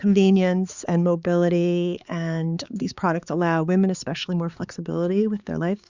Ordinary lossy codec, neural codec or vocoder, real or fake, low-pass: Opus, 64 kbps; codec, 16 kHz, 4 kbps, X-Codec, HuBERT features, trained on balanced general audio; fake; 7.2 kHz